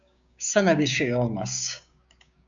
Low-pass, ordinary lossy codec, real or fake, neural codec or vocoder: 7.2 kHz; MP3, 96 kbps; fake; codec, 16 kHz, 6 kbps, DAC